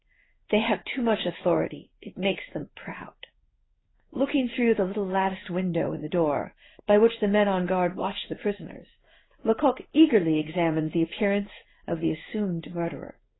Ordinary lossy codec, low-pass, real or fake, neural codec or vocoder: AAC, 16 kbps; 7.2 kHz; fake; codec, 16 kHz in and 24 kHz out, 1 kbps, XY-Tokenizer